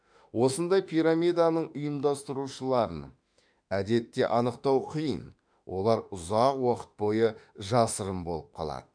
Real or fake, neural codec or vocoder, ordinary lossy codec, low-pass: fake; autoencoder, 48 kHz, 32 numbers a frame, DAC-VAE, trained on Japanese speech; none; 9.9 kHz